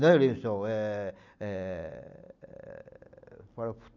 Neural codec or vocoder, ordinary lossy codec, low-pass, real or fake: none; none; 7.2 kHz; real